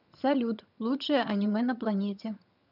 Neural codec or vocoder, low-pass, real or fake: vocoder, 22.05 kHz, 80 mel bands, HiFi-GAN; 5.4 kHz; fake